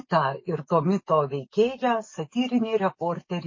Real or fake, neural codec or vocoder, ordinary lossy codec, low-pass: fake; codec, 44.1 kHz, 7.8 kbps, DAC; MP3, 32 kbps; 7.2 kHz